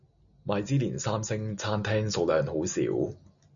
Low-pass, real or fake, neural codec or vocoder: 7.2 kHz; real; none